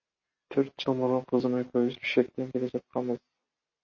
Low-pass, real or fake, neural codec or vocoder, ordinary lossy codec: 7.2 kHz; real; none; MP3, 32 kbps